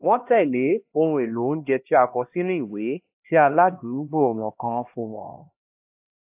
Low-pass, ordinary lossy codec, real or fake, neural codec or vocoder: 3.6 kHz; AAC, 32 kbps; fake; codec, 16 kHz, 1 kbps, X-Codec, HuBERT features, trained on LibriSpeech